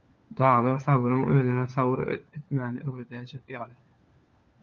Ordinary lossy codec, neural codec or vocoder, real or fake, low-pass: Opus, 24 kbps; codec, 16 kHz, 4 kbps, FunCodec, trained on LibriTTS, 50 frames a second; fake; 7.2 kHz